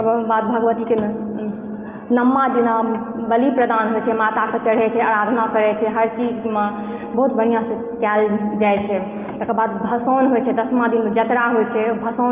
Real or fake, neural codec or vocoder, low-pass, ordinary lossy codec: real; none; 3.6 kHz; Opus, 24 kbps